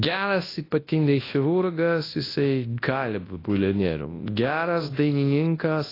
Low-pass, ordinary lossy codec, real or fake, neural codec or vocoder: 5.4 kHz; AAC, 24 kbps; fake; codec, 24 kHz, 0.9 kbps, WavTokenizer, large speech release